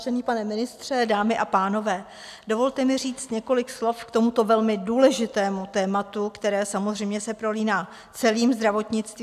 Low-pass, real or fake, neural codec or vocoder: 14.4 kHz; real; none